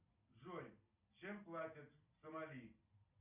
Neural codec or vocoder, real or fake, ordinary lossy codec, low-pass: none; real; MP3, 32 kbps; 3.6 kHz